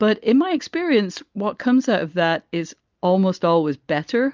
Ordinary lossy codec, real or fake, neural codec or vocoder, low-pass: Opus, 24 kbps; real; none; 7.2 kHz